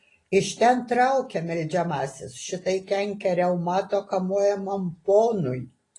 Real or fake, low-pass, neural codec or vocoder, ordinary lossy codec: real; 10.8 kHz; none; AAC, 32 kbps